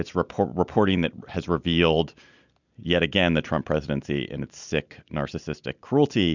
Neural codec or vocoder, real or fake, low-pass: none; real; 7.2 kHz